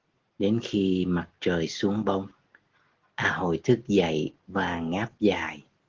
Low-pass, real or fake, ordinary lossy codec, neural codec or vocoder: 7.2 kHz; real; Opus, 16 kbps; none